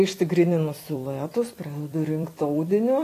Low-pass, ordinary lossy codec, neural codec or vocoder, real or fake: 14.4 kHz; AAC, 48 kbps; vocoder, 44.1 kHz, 128 mel bands every 256 samples, BigVGAN v2; fake